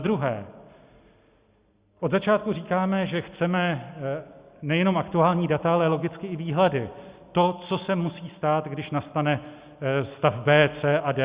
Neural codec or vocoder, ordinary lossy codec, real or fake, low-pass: none; Opus, 64 kbps; real; 3.6 kHz